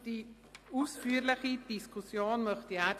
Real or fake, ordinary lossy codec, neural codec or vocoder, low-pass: real; AAC, 64 kbps; none; 14.4 kHz